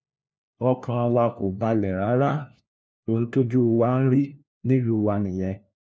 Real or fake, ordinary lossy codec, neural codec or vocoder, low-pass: fake; none; codec, 16 kHz, 1 kbps, FunCodec, trained on LibriTTS, 50 frames a second; none